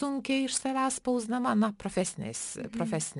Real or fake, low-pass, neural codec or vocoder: real; 10.8 kHz; none